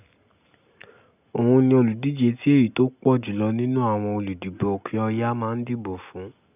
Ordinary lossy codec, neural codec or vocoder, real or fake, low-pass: none; none; real; 3.6 kHz